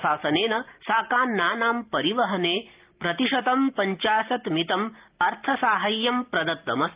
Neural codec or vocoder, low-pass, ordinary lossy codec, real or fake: none; 3.6 kHz; Opus, 32 kbps; real